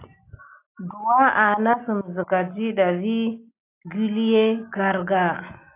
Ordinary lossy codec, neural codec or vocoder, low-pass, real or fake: AAC, 24 kbps; none; 3.6 kHz; real